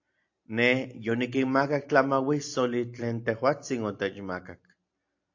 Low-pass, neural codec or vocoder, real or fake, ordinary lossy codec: 7.2 kHz; none; real; AAC, 48 kbps